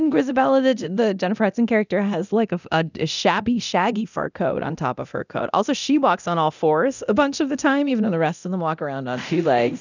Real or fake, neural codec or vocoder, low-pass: fake; codec, 24 kHz, 0.9 kbps, DualCodec; 7.2 kHz